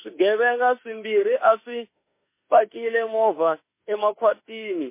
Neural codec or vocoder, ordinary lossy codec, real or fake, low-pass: autoencoder, 48 kHz, 32 numbers a frame, DAC-VAE, trained on Japanese speech; MP3, 24 kbps; fake; 3.6 kHz